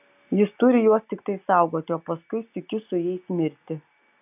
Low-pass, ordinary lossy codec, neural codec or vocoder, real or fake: 3.6 kHz; AAC, 32 kbps; none; real